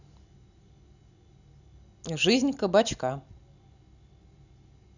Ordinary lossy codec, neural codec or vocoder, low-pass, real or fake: none; none; 7.2 kHz; real